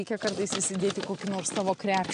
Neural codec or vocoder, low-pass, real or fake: none; 9.9 kHz; real